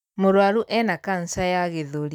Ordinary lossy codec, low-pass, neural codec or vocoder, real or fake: none; 19.8 kHz; none; real